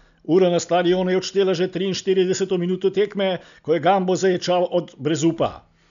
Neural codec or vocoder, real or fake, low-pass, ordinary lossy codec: none; real; 7.2 kHz; none